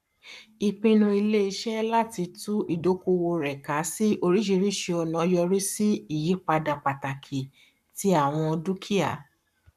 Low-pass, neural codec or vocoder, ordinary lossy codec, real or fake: 14.4 kHz; codec, 44.1 kHz, 7.8 kbps, Pupu-Codec; none; fake